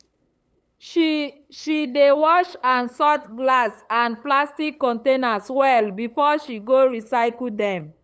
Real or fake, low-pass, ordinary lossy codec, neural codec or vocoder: fake; none; none; codec, 16 kHz, 8 kbps, FunCodec, trained on LibriTTS, 25 frames a second